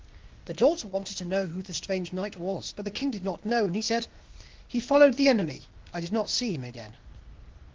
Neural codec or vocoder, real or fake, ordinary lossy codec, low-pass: codec, 16 kHz, 0.8 kbps, ZipCodec; fake; Opus, 16 kbps; 7.2 kHz